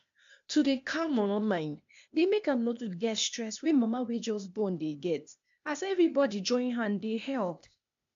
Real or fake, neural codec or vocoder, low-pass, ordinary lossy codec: fake; codec, 16 kHz, 0.8 kbps, ZipCodec; 7.2 kHz; AAC, 64 kbps